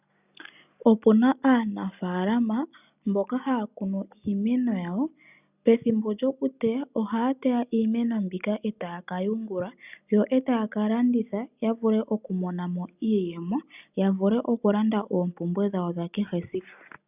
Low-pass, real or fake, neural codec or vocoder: 3.6 kHz; real; none